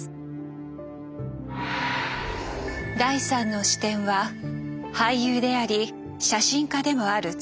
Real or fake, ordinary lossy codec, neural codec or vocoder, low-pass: real; none; none; none